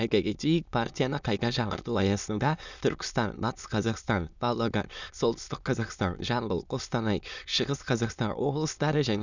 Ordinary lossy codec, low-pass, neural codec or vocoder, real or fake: none; 7.2 kHz; autoencoder, 22.05 kHz, a latent of 192 numbers a frame, VITS, trained on many speakers; fake